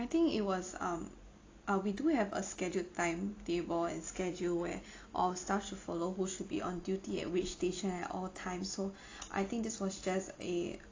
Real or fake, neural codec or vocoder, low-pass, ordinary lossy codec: real; none; 7.2 kHz; AAC, 32 kbps